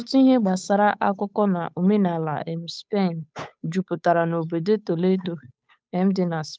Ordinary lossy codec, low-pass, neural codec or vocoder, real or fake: none; none; codec, 16 kHz, 8 kbps, FunCodec, trained on Chinese and English, 25 frames a second; fake